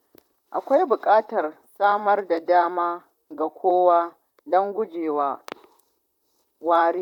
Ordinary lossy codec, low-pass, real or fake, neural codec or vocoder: none; 19.8 kHz; fake; vocoder, 44.1 kHz, 128 mel bands, Pupu-Vocoder